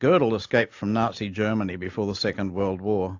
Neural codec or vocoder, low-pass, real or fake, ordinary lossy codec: none; 7.2 kHz; real; AAC, 48 kbps